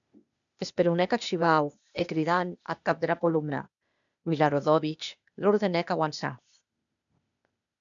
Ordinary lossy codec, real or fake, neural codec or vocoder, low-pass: MP3, 96 kbps; fake; codec, 16 kHz, 0.8 kbps, ZipCodec; 7.2 kHz